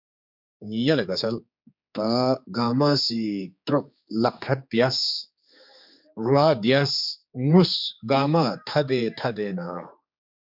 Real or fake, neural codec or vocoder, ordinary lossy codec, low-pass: fake; codec, 16 kHz, 4 kbps, X-Codec, HuBERT features, trained on general audio; MP3, 48 kbps; 5.4 kHz